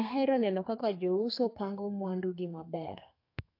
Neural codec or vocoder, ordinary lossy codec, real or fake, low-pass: codec, 32 kHz, 1.9 kbps, SNAC; AAC, 32 kbps; fake; 5.4 kHz